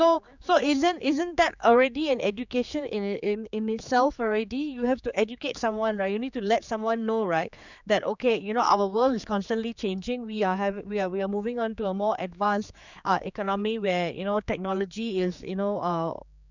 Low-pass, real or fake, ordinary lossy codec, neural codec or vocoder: 7.2 kHz; fake; none; codec, 16 kHz, 4 kbps, X-Codec, HuBERT features, trained on general audio